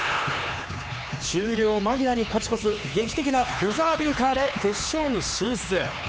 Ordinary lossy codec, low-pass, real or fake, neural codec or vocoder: none; none; fake; codec, 16 kHz, 4 kbps, X-Codec, HuBERT features, trained on LibriSpeech